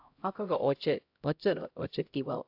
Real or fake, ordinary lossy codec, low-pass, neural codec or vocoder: fake; AAC, 48 kbps; 5.4 kHz; codec, 16 kHz, 0.5 kbps, X-Codec, HuBERT features, trained on LibriSpeech